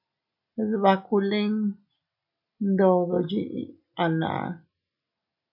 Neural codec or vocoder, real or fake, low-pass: none; real; 5.4 kHz